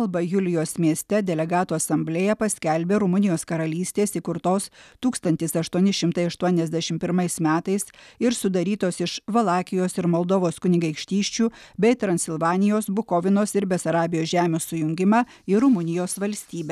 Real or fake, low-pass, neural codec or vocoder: real; 14.4 kHz; none